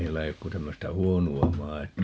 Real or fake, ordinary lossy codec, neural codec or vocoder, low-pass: real; none; none; none